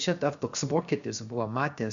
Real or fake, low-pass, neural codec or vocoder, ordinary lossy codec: fake; 7.2 kHz; codec, 16 kHz, 0.7 kbps, FocalCodec; Opus, 64 kbps